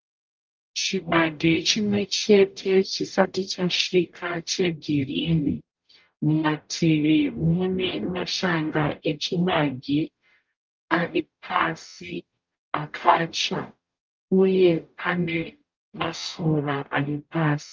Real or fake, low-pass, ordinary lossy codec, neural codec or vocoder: fake; 7.2 kHz; Opus, 32 kbps; codec, 44.1 kHz, 0.9 kbps, DAC